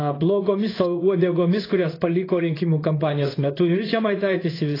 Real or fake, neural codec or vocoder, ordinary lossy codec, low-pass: fake; codec, 16 kHz in and 24 kHz out, 1 kbps, XY-Tokenizer; AAC, 24 kbps; 5.4 kHz